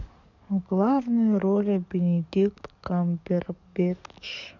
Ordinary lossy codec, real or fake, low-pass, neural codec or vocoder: none; fake; 7.2 kHz; codec, 44.1 kHz, 7.8 kbps, DAC